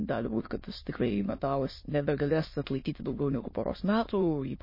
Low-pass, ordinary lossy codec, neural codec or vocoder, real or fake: 5.4 kHz; MP3, 24 kbps; autoencoder, 22.05 kHz, a latent of 192 numbers a frame, VITS, trained on many speakers; fake